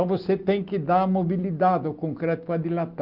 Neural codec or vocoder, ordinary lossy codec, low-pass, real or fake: none; Opus, 32 kbps; 5.4 kHz; real